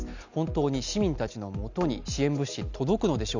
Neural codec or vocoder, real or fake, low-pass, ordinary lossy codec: none; real; 7.2 kHz; none